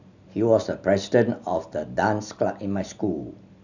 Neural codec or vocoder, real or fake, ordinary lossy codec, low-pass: none; real; none; 7.2 kHz